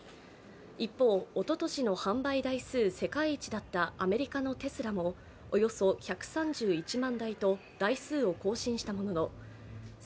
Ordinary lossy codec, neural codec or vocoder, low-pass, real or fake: none; none; none; real